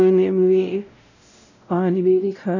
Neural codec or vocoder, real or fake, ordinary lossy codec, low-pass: codec, 16 kHz, 0.5 kbps, X-Codec, WavLM features, trained on Multilingual LibriSpeech; fake; none; 7.2 kHz